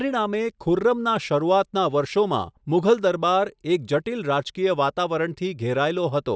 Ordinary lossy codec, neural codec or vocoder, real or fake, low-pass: none; none; real; none